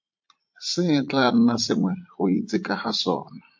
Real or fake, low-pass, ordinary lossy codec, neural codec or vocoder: fake; 7.2 kHz; MP3, 48 kbps; vocoder, 44.1 kHz, 80 mel bands, Vocos